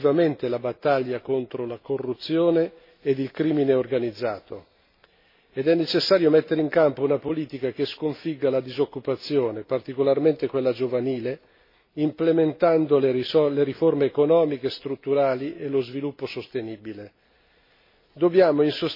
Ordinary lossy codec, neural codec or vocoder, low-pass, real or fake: MP3, 24 kbps; none; 5.4 kHz; real